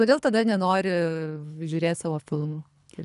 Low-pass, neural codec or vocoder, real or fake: 10.8 kHz; codec, 24 kHz, 3 kbps, HILCodec; fake